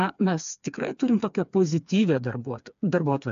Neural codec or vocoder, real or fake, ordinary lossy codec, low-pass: codec, 16 kHz, 4 kbps, FreqCodec, smaller model; fake; MP3, 64 kbps; 7.2 kHz